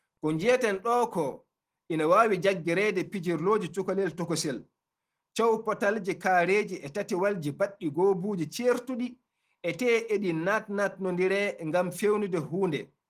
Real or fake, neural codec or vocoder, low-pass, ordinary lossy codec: real; none; 14.4 kHz; Opus, 24 kbps